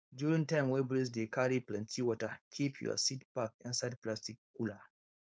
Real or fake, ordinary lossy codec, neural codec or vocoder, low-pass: fake; none; codec, 16 kHz, 4.8 kbps, FACodec; none